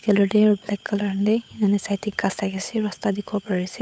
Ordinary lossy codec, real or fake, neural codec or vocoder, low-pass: none; fake; codec, 16 kHz, 8 kbps, FunCodec, trained on Chinese and English, 25 frames a second; none